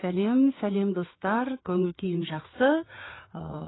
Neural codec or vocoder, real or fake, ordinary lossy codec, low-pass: codec, 16 kHz, 4 kbps, FreqCodec, larger model; fake; AAC, 16 kbps; 7.2 kHz